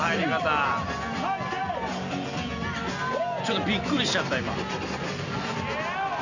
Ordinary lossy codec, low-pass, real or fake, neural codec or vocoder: none; 7.2 kHz; real; none